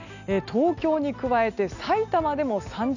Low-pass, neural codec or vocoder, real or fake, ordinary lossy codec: 7.2 kHz; none; real; none